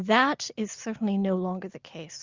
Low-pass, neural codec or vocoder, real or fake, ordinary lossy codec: 7.2 kHz; none; real; Opus, 64 kbps